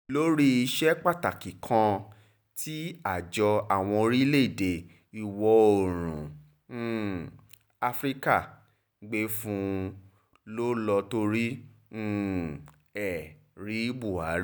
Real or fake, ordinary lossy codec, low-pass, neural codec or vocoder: real; none; none; none